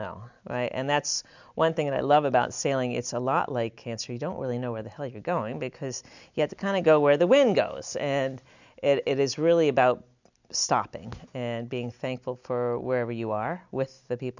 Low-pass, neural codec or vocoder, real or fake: 7.2 kHz; none; real